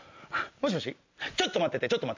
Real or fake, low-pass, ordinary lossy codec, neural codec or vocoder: real; 7.2 kHz; MP3, 48 kbps; none